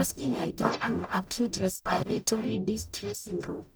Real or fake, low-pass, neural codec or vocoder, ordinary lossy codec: fake; none; codec, 44.1 kHz, 0.9 kbps, DAC; none